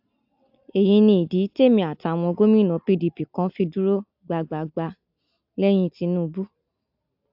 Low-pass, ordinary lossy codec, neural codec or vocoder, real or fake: 5.4 kHz; AAC, 48 kbps; none; real